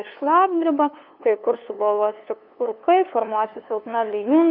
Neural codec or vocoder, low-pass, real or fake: codec, 16 kHz, 2 kbps, FunCodec, trained on LibriTTS, 25 frames a second; 5.4 kHz; fake